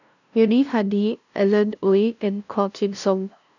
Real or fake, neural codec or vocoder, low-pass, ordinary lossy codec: fake; codec, 16 kHz, 0.5 kbps, FunCodec, trained on LibriTTS, 25 frames a second; 7.2 kHz; none